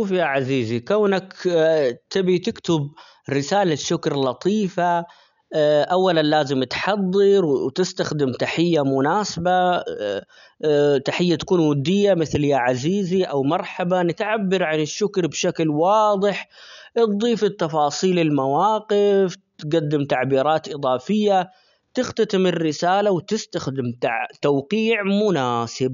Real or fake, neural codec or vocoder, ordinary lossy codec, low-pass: real; none; none; 7.2 kHz